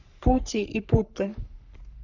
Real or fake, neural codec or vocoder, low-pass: fake; codec, 44.1 kHz, 3.4 kbps, Pupu-Codec; 7.2 kHz